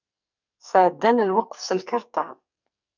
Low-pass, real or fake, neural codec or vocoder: 7.2 kHz; fake; codec, 44.1 kHz, 2.6 kbps, SNAC